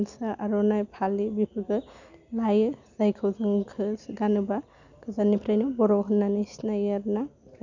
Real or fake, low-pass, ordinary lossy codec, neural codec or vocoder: real; 7.2 kHz; none; none